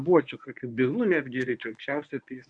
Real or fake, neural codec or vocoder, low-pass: fake; codec, 24 kHz, 0.9 kbps, WavTokenizer, medium speech release version 1; 9.9 kHz